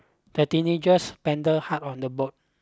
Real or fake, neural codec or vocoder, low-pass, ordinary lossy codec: real; none; none; none